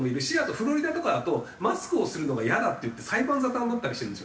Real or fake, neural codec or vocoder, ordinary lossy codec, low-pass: real; none; none; none